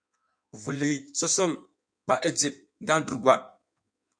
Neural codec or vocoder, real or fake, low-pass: codec, 16 kHz in and 24 kHz out, 1.1 kbps, FireRedTTS-2 codec; fake; 9.9 kHz